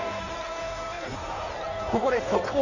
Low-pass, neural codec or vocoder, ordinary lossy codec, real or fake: 7.2 kHz; codec, 16 kHz in and 24 kHz out, 1.1 kbps, FireRedTTS-2 codec; none; fake